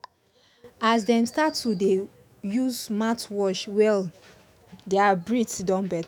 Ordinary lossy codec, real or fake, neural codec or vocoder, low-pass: none; fake; autoencoder, 48 kHz, 128 numbers a frame, DAC-VAE, trained on Japanese speech; none